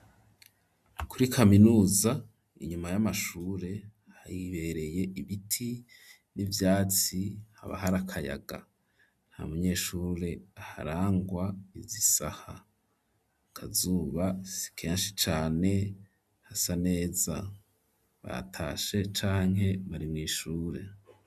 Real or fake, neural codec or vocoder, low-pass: real; none; 14.4 kHz